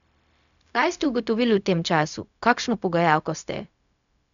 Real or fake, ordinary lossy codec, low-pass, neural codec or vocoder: fake; none; 7.2 kHz; codec, 16 kHz, 0.4 kbps, LongCat-Audio-Codec